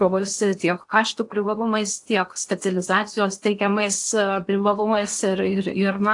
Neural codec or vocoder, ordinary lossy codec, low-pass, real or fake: codec, 16 kHz in and 24 kHz out, 0.8 kbps, FocalCodec, streaming, 65536 codes; AAC, 64 kbps; 10.8 kHz; fake